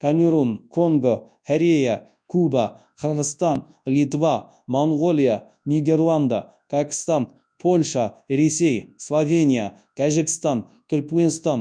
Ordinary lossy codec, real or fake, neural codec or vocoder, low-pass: none; fake; codec, 24 kHz, 0.9 kbps, WavTokenizer, large speech release; 9.9 kHz